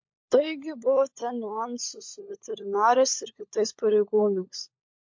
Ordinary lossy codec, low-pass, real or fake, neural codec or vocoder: MP3, 48 kbps; 7.2 kHz; fake; codec, 16 kHz, 16 kbps, FunCodec, trained on LibriTTS, 50 frames a second